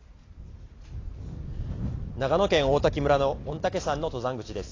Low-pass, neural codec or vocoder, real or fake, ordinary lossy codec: 7.2 kHz; none; real; AAC, 32 kbps